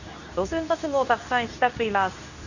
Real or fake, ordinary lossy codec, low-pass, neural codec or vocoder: fake; none; 7.2 kHz; codec, 24 kHz, 0.9 kbps, WavTokenizer, medium speech release version 2